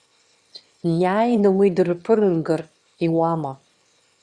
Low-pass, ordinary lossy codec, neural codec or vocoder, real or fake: 9.9 kHz; Opus, 64 kbps; autoencoder, 22.05 kHz, a latent of 192 numbers a frame, VITS, trained on one speaker; fake